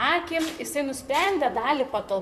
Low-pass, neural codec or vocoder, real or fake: 14.4 kHz; vocoder, 44.1 kHz, 128 mel bands, Pupu-Vocoder; fake